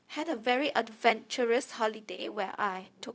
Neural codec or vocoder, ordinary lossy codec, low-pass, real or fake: codec, 16 kHz, 0.4 kbps, LongCat-Audio-Codec; none; none; fake